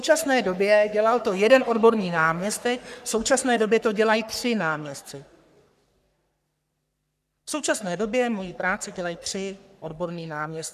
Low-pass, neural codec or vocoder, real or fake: 14.4 kHz; codec, 44.1 kHz, 3.4 kbps, Pupu-Codec; fake